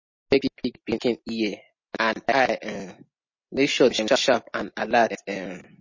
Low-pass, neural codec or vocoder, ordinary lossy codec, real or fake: 7.2 kHz; none; MP3, 32 kbps; real